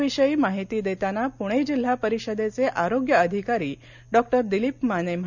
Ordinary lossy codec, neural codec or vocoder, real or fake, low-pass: none; none; real; 7.2 kHz